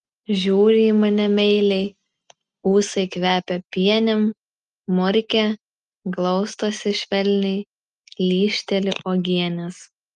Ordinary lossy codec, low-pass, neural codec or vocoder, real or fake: Opus, 32 kbps; 10.8 kHz; none; real